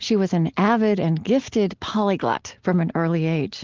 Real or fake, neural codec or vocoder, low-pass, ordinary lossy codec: real; none; 7.2 kHz; Opus, 16 kbps